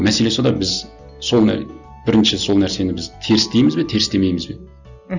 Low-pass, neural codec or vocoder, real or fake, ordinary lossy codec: 7.2 kHz; none; real; none